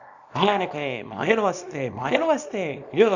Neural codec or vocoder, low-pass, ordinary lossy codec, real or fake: codec, 24 kHz, 0.9 kbps, WavTokenizer, small release; 7.2 kHz; none; fake